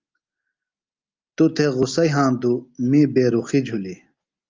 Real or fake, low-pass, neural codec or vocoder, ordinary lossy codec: real; 7.2 kHz; none; Opus, 24 kbps